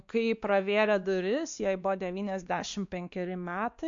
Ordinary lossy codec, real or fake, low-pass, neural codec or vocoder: MP3, 64 kbps; fake; 7.2 kHz; codec, 16 kHz, 2 kbps, X-Codec, WavLM features, trained on Multilingual LibriSpeech